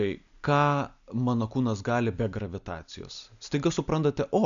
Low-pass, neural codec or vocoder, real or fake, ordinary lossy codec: 7.2 kHz; none; real; MP3, 96 kbps